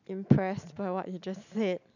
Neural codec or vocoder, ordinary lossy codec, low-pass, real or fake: none; none; 7.2 kHz; real